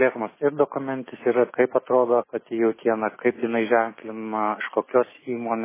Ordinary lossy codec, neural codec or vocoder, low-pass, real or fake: MP3, 16 kbps; none; 3.6 kHz; real